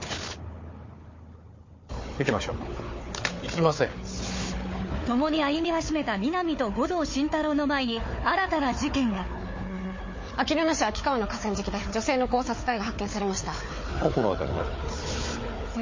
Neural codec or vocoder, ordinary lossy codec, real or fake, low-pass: codec, 16 kHz, 4 kbps, FunCodec, trained on Chinese and English, 50 frames a second; MP3, 32 kbps; fake; 7.2 kHz